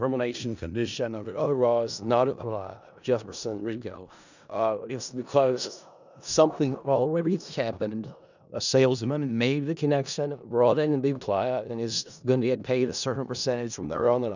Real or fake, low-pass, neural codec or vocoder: fake; 7.2 kHz; codec, 16 kHz in and 24 kHz out, 0.4 kbps, LongCat-Audio-Codec, four codebook decoder